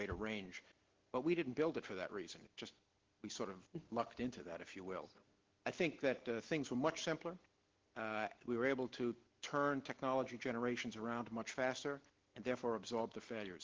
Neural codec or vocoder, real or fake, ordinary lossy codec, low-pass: none; real; Opus, 16 kbps; 7.2 kHz